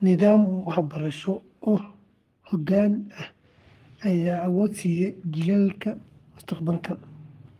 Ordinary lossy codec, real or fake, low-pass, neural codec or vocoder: Opus, 32 kbps; fake; 14.4 kHz; codec, 44.1 kHz, 3.4 kbps, Pupu-Codec